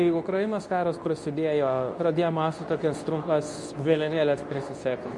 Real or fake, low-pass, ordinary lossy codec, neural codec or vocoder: fake; 10.8 kHz; MP3, 64 kbps; codec, 24 kHz, 0.9 kbps, WavTokenizer, medium speech release version 2